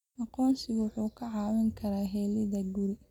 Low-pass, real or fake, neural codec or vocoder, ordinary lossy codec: 19.8 kHz; real; none; none